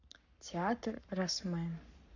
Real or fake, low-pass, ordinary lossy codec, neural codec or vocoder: fake; 7.2 kHz; none; codec, 44.1 kHz, 7.8 kbps, Pupu-Codec